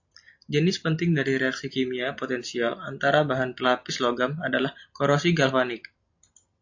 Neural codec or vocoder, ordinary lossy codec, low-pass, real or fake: none; AAC, 48 kbps; 7.2 kHz; real